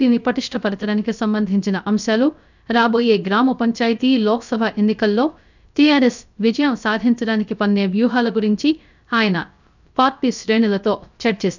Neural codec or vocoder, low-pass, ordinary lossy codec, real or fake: codec, 16 kHz, 0.3 kbps, FocalCodec; 7.2 kHz; none; fake